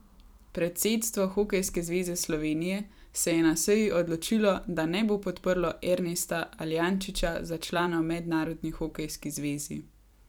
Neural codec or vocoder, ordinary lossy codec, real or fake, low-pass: vocoder, 44.1 kHz, 128 mel bands every 256 samples, BigVGAN v2; none; fake; none